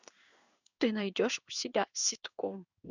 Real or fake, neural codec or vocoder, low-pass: fake; codec, 16 kHz in and 24 kHz out, 1 kbps, XY-Tokenizer; 7.2 kHz